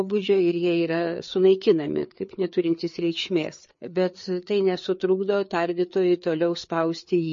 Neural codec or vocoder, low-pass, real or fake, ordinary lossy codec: codec, 16 kHz, 4 kbps, FreqCodec, larger model; 7.2 kHz; fake; MP3, 32 kbps